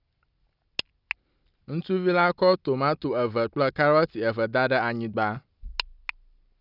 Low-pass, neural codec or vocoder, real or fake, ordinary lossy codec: 5.4 kHz; none; real; none